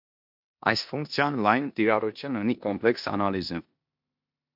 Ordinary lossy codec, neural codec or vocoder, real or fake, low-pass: MP3, 48 kbps; codec, 16 kHz in and 24 kHz out, 0.9 kbps, LongCat-Audio-Codec, four codebook decoder; fake; 5.4 kHz